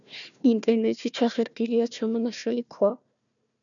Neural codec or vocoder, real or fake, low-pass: codec, 16 kHz, 1 kbps, FunCodec, trained on Chinese and English, 50 frames a second; fake; 7.2 kHz